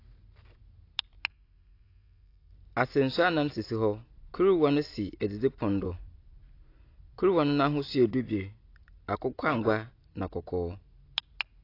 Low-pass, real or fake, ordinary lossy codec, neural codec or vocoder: 5.4 kHz; real; AAC, 32 kbps; none